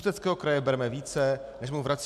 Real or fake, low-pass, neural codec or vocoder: real; 14.4 kHz; none